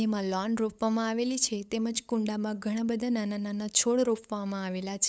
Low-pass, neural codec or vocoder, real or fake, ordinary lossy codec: none; codec, 16 kHz, 8 kbps, FunCodec, trained on LibriTTS, 25 frames a second; fake; none